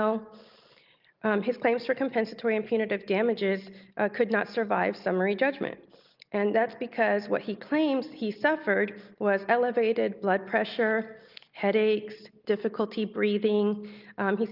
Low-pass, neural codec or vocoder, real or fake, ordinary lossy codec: 5.4 kHz; none; real; Opus, 24 kbps